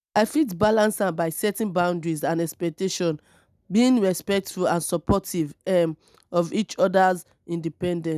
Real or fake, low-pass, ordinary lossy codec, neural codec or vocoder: real; 14.4 kHz; none; none